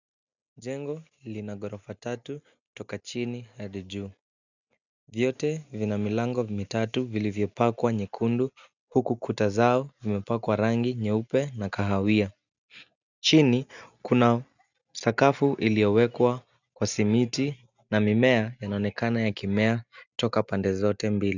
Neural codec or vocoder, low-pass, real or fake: none; 7.2 kHz; real